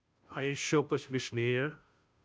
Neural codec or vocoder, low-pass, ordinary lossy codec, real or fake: codec, 16 kHz, 0.5 kbps, FunCodec, trained on Chinese and English, 25 frames a second; none; none; fake